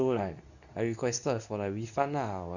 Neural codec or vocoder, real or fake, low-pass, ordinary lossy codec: codec, 24 kHz, 0.9 kbps, WavTokenizer, medium speech release version 2; fake; 7.2 kHz; none